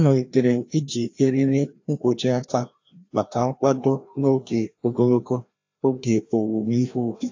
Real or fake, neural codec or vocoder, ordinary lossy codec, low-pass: fake; codec, 16 kHz, 1 kbps, FreqCodec, larger model; AAC, 48 kbps; 7.2 kHz